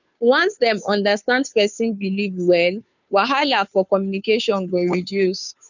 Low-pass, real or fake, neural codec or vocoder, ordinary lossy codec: 7.2 kHz; fake; codec, 16 kHz, 2 kbps, FunCodec, trained on Chinese and English, 25 frames a second; none